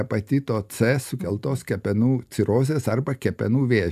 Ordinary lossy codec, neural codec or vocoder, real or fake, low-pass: AAC, 96 kbps; none; real; 14.4 kHz